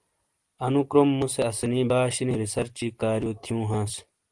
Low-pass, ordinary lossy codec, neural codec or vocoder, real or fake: 10.8 kHz; Opus, 24 kbps; none; real